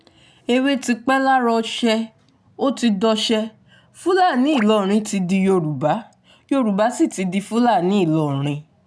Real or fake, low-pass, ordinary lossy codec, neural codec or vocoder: real; none; none; none